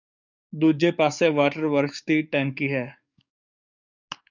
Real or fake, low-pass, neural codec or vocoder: fake; 7.2 kHz; codec, 44.1 kHz, 7.8 kbps, DAC